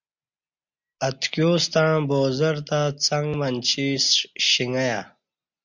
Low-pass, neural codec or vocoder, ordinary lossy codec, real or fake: 7.2 kHz; none; MP3, 64 kbps; real